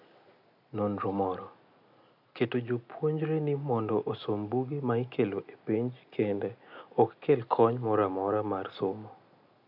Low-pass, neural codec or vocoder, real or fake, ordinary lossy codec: 5.4 kHz; none; real; none